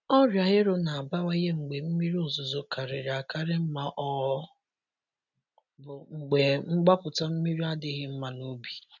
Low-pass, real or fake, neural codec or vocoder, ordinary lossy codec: 7.2 kHz; real; none; none